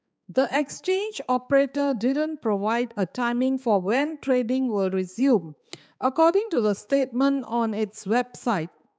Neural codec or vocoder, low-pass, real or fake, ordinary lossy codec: codec, 16 kHz, 4 kbps, X-Codec, HuBERT features, trained on balanced general audio; none; fake; none